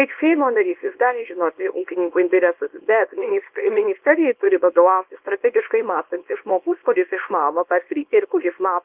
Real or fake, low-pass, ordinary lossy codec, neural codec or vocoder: fake; 3.6 kHz; Opus, 32 kbps; codec, 24 kHz, 0.9 kbps, WavTokenizer, small release